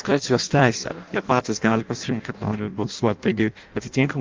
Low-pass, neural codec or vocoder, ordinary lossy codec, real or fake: 7.2 kHz; codec, 16 kHz in and 24 kHz out, 0.6 kbps, FireRedTTS-2 codec; Opus, 24 kbps; fake